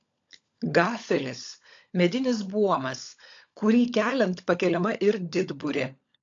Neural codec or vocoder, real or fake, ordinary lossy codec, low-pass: codec, 16 kHz, 16 kbps, FunCodec, trained on LibriTTS, 50 frames a second; fake; AAC, 48 kbps; 7.2 kHz